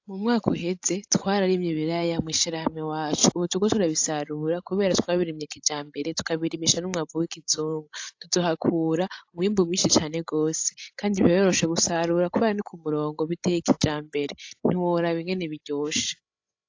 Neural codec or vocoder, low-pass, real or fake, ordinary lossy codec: codec, 16 kHz, 16 kbps, FreqCodec, larger model; 7.2 kHz; fake; AAC, 48 kbps